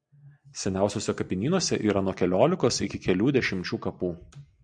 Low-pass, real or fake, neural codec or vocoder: 9.9 kHz; real; none